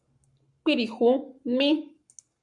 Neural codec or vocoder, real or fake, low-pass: codec, 44.1 kHz, 7.8 kbps, Pupu-Codec; fake; 10.8 kHz